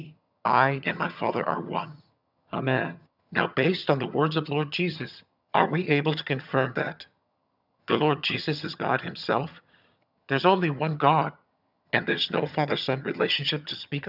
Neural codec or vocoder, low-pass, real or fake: vocoder, 22.05 kHz, 80 mel bands, HiFi-GAN; 5.4 kHz; fake